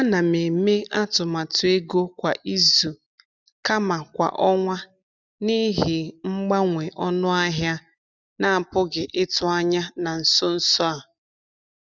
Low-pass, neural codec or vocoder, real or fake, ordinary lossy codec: 7.2 kHz; none; real; none